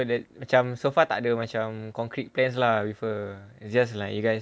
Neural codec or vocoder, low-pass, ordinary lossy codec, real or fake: none; none; none; real